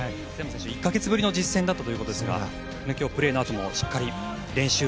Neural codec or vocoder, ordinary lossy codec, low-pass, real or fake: none; none; none; real